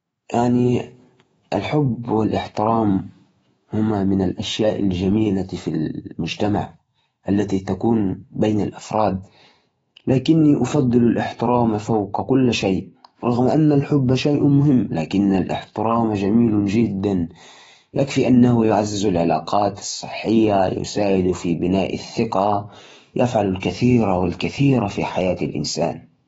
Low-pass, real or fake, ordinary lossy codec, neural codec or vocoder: 19.8 kHz; fake; AAC, 24 kbps; autoencoder, 48 kHz, 128 numbers a frame, DAC-VAE, trained on Japanese speech